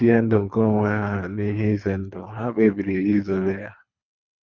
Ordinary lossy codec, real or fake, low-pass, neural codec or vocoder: none; fake; 7.2 kHz; codec, 24 kHz, 3 kbps, HILCodec